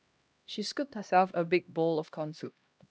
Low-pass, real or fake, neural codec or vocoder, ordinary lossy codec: none; fake; codec, 16 kHz, 1 kbps, X-Codec, HuBERT features, trained on LibriSpeech; none